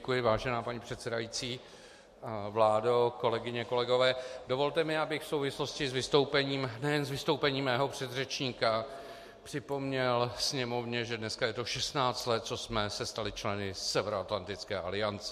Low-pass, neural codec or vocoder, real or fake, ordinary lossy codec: 14.4 kHz; none; real; MP3, 64 kbps